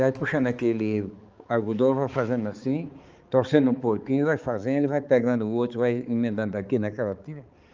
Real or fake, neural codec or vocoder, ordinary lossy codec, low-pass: fake; codec, 16 kHz, 4 kbps, X-Codec, HuBERT features, trained on balanced general audio; Opus, 24 kbps; 7.2 kHz